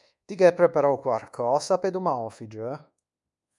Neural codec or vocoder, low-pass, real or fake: codec, 24 kHz, 1.2 kbps, DualCodec; 10.8 kHz; fake